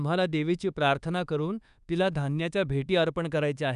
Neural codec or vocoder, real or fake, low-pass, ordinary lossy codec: codec, 24 kHz, 1.2 kbps, DualCodec; fake; 10.8 kHz; none